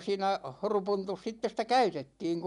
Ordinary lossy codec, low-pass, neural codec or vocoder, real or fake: MP3, 96 kbps; 10.8 kHz; none; real